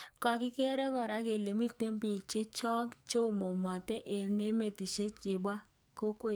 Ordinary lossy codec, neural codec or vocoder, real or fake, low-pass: none; codec, 44.1 kHz, 2.6 kbps, SNAC; fake; none